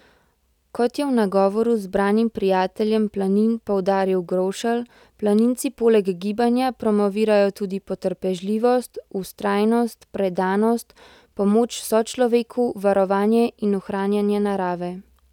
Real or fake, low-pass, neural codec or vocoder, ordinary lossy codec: real; 19.8 kHz; none; none